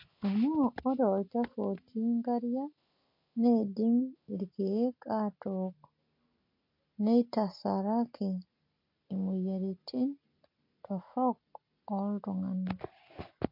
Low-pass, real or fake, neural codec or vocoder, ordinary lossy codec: 5.4 kHz; real; none; MP3, 32 kbps